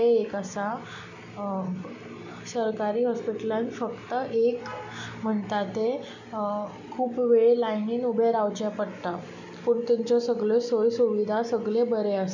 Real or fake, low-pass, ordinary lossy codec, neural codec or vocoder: real; 7.2 kHz; none; none